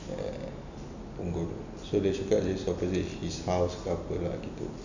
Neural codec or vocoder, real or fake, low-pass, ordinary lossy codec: none; real; 7.2 kHz; none